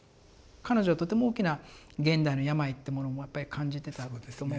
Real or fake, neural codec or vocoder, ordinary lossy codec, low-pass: real; none; none; none